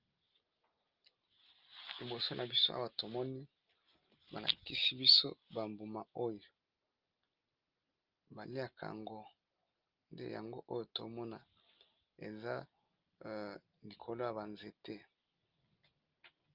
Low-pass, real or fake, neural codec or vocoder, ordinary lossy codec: 5.4 kHz; real; none; Opus, 24 kbps